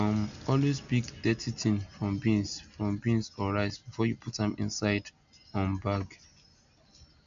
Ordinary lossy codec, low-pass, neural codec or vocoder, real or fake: MP3, 64 kbps; 7.2 kHz; none; real